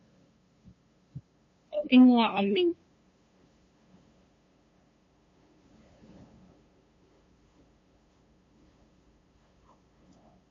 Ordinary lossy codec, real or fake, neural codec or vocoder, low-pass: MP3, 32 kbps; fake; codec, 16 kHz, 2 kbps, FunCodec, trained on LibriTTS, 25 frames a second; 7.2 kHz